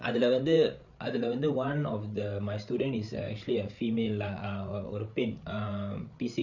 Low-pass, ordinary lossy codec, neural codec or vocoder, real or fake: 7.2 kHz; none; codec, 16 kHz, 8 kbps, FreqCodec, larger model; fake